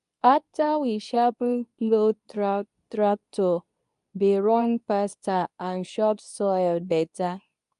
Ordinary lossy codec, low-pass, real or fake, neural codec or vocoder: none; 10.8 kHz; fake; codec, 24 kHz, 0.9 kbps, WavTokenizer, medium speech release version 2